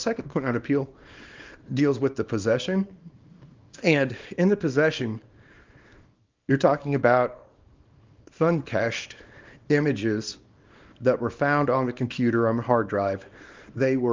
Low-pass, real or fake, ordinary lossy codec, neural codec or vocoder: 7.2 kHz; fake; Opus, 24 kbps; codec, 24 kHz, 0.9 kbps, WavTokenizer, small release